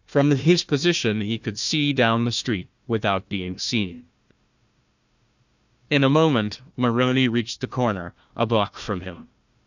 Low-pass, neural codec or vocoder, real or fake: 7.2 kHz; codec, 16 kHz, 1 kbps, FunCodec, trained on Chinese and English, 50 frames a second; fake